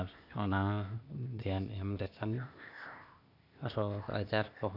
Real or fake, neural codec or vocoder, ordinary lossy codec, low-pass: fake; codec, 16 kHz, 0.8 kbps, ZipCodec; none; 5.4 kHz